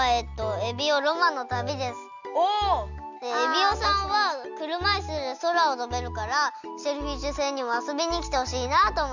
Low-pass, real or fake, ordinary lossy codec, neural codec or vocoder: 7.2 kHz; real; Opus, 64 kbps; none